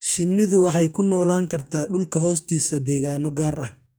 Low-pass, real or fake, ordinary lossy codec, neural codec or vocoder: none; fake; none; codec, 44.1 kHz, 2.6 kbps, DAC